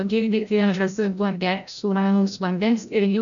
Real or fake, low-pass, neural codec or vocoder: fake; 7.2 kHz; codec, 16 kHz, 0.5 kbps, FreqCodec, larger model